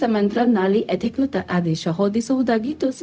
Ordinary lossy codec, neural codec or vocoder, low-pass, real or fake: none; codec, 16 kHz, 0.4 kbps, LongCat-Audio-Codec; none; fake